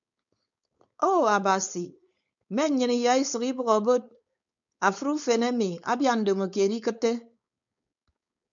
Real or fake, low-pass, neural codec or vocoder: fake; 7.2 kHz; codec, 16 kHz, 4.8 kbps, FACodec